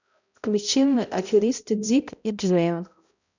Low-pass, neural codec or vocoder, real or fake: 7.2 kHz; codec, 16 kHz, 0.5 kbps, X-Codec, HuBERT features, trained on balanced general audio; fake